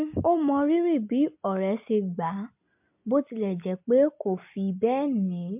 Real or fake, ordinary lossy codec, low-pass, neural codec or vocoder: real; MP3, 32 kbps; 3.6 kHz; none